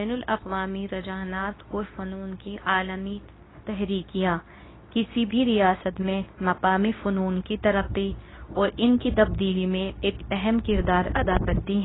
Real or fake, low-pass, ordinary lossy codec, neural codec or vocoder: fake; 7.2 kHz; AAC, 16 kbps; codec, 24 kHz, 0.9 kbps, WavTokenizer, medium speech release version 2